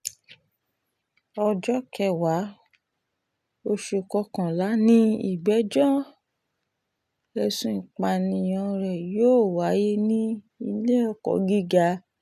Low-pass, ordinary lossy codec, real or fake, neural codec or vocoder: 14.4 kHz; none; real; none